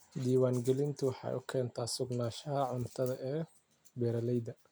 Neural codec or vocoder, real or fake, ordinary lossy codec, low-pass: none; real; none; none